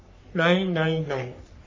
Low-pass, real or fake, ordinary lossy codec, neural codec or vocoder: 7.2 kHz; fake; MP3, 32 kbps; codec, 44.1 kHz, 3.4 kbps, Pupu-Codec